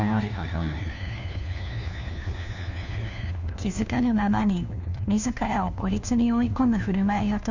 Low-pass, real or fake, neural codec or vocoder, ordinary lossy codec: 7.2 kHz; fake; codec, 16 kHz, 1 kbps, FunCodec, trained on LibriTTS, 50 frames a second; none